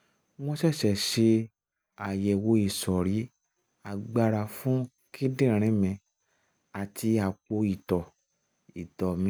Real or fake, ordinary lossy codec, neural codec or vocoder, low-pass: real; none; none; none